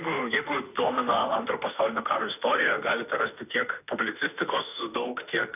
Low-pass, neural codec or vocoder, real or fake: 3.6 kHz; autoencoder, 48 kHz, 32 numbers a frame, DAC-VAE, trained on Japanese speech; fake